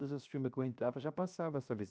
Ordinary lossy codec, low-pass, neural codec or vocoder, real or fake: none; none; codec, 16 kHz, 0.7 kbps, FocalCodec; fake